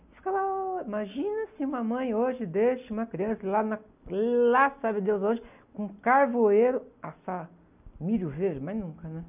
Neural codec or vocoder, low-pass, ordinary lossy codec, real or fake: none; 3.6 kHz; none; real